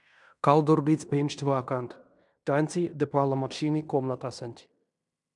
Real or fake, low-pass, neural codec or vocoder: fake; 10.8 kHz; codec, 16 kHz in and 24 kHz out, 0.9 kbps, LongCat-Audio-Codec, fine tuned four codebook decoder